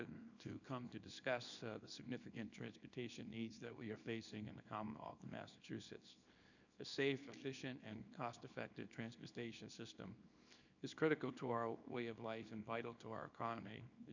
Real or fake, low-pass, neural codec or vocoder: fake; 7.2 kHz; codec, 24 kHz, 0.9 kbps, WavTokenizer, small release